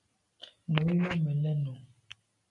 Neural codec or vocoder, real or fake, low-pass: none; real; 10.8 kHz